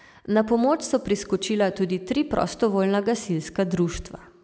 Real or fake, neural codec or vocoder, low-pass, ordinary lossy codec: real; none; none; none